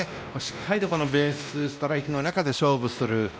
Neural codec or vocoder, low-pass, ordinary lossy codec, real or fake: codec, 16 kHz, 1 kbps, X-Codec, WavLM features, trained on Multilingual LibriSpeech; none; none; fake